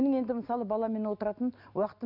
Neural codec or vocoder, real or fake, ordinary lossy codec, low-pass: none; real; none; 5.4 kHz